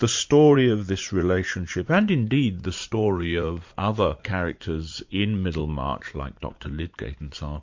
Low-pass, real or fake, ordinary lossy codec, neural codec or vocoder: 7.2 kHz; fake; MP3, 48 kbps; vocoder, 44.1 kHz, 80 mel bands, Vocos